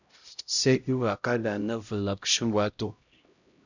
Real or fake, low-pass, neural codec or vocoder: fake; 7.2 kHz; codec, 16 kHz, 0.5 kbps, X-Codec, HuBERT features, trained on LibriSpeech